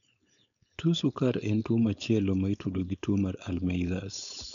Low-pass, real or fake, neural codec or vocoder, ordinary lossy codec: 7.2 kHz; fake; codec, 16 kHz, 4.8 kbps, FACodec; none